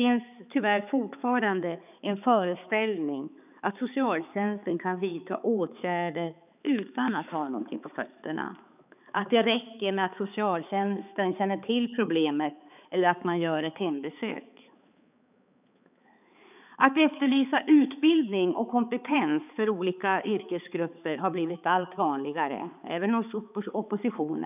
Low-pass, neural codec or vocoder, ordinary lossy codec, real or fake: 3.6 kHz; codec, 16 kHz, 4 kbps, X-Codec, HuBERT features, trained on balanced general audio; none; fake